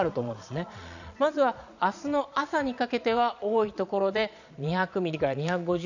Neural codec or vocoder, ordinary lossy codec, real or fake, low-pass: vocoder, 22.05 kHz, 80 mel bands, Vocos; none; fake; 7.2 kHz